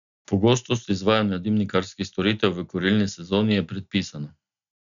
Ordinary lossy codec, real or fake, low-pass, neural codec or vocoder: none; real; 7.2 kHz; none